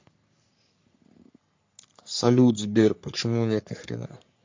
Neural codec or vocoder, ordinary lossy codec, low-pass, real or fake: codec, 44.1 kHz, 3.4 kbps, Pupu-Codec; MP3, 48 kbps; 7.2 kHz; fake